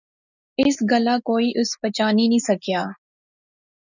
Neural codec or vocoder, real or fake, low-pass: none; real; 7.2 kHz